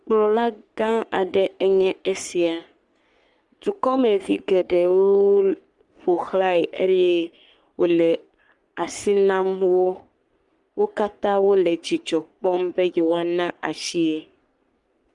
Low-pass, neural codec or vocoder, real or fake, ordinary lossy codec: 10.8 kHz; codec, 44.1 kHz, 3.4 kbps, Pupu-Codec; fake; Opus, 32 kbps